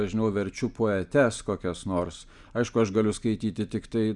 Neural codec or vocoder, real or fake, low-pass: none; real; 10.8 kHz